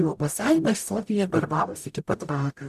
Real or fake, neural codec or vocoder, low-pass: fake; codec, 44.1 kHz, 0.9 kbps, DAC; 14.4 kHz